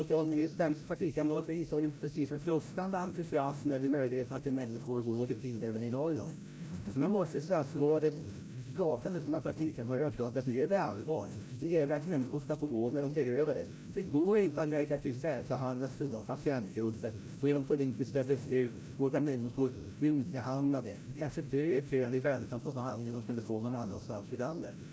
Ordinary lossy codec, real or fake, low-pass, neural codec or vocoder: none; fake; none; codec, 16 kHz, 0.5 kbps, FreqCodec, larger model